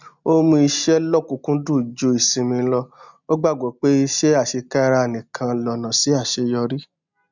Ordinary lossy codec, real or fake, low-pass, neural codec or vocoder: none; real; 7.2 kHz; none